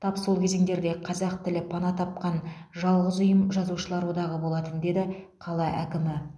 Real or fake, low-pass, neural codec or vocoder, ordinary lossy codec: real; none; none; none